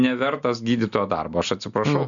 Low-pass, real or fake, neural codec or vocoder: 7.2 kHz; real; none